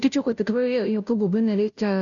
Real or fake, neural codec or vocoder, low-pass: fake; codec, 16 kHz, 0.5 kbps, FunCodec, trained on Chinese and English, 25 frames a second; 7.2 kHz